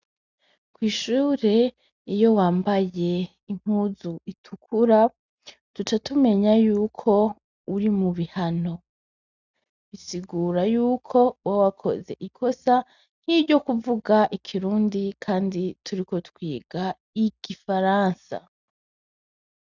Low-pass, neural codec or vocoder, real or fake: 7.2 kHz; none; real